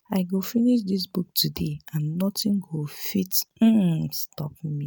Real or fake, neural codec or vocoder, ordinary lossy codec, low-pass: real; none; none; none